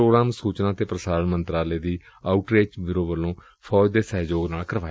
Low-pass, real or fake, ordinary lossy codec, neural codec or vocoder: none; real; none; none